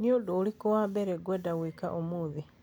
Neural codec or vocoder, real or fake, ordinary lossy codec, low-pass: none; real; none; none